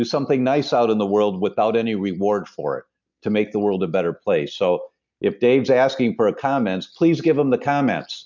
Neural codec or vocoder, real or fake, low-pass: none; real; 7.2 kHz